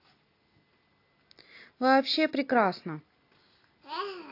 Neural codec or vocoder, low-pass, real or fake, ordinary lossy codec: none; 5.4 kHz; real; AAC, 32 kbps